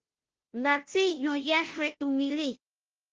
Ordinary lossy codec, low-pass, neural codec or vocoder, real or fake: Opus, 16 kbps; 7.2 kHz; codec, 16 kHz, 0.5 kbps, FunCodec, trained on Chinese and English, 25 frames a second; fake